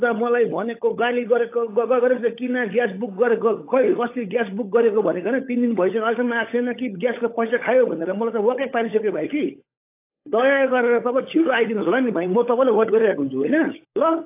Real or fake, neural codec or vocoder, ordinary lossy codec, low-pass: fake; codec, 16 kHz, 16 kbps, FunCodec, trained on LibriTTS, 50 frames a second; AAC, 24 kbps; 3.6 kHz